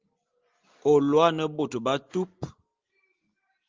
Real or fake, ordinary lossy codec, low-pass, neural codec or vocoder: real; Opus, 24 kbps; 7.2 kHz; none